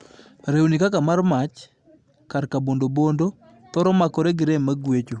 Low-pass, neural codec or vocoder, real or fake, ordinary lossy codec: 10.8 kHz; none; real; Opus, 64 kbps